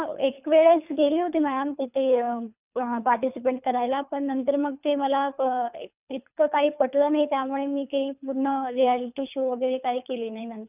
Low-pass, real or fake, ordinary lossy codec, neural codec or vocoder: 3.6 kHz; fake; none; codec, 24 kHz, 3 kbps, HILCodec